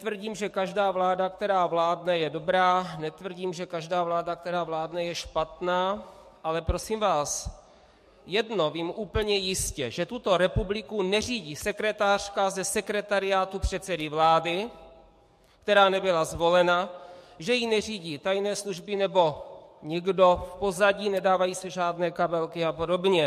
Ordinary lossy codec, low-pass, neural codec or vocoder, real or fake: MP3, 64 kbps; 14.4 kHz; codec, 44.1 kHz, 7.8 kbps, DAC; fake